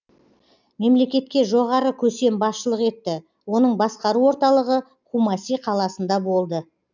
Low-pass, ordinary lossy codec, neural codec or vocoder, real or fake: 7.2 kHz; none; none; real